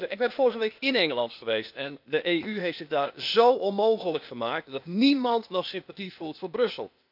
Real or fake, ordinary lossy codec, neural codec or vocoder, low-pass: fake; none; codec, 16 kHz, 0.8 kbps, ZipCodec; 5.4 kHz